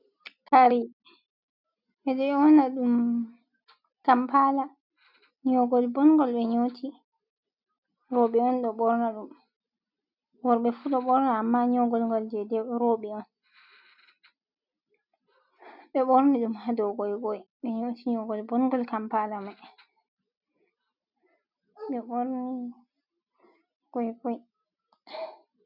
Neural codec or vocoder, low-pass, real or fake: none; 5.4 kHz; real